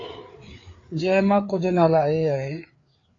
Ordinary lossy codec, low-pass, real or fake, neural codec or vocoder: AAC, 32 kbps; 7.2 kHz; fake; codec, 16 kHz, 4 kbps, FreqCodec, larger model